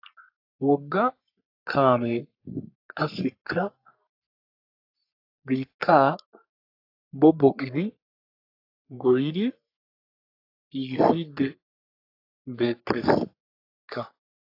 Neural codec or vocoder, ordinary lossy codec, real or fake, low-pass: codec, 44.1 kHz, 3.4 kbps, Pupu-Codec; AAC, 32 kbps; fake; 5.4 kHz